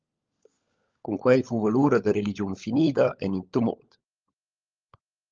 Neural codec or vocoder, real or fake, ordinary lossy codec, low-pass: codec, 16 kHz, 16 kbps, FunCodec, trained on LibriTTS, 50 frames a second; fake; Opus, 24 kbps; 7.2 kHz